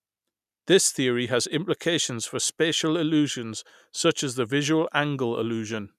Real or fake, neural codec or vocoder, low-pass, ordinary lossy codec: real; none; 14.4 kHz; none